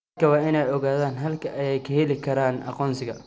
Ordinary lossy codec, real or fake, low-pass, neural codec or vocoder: none; real; none; none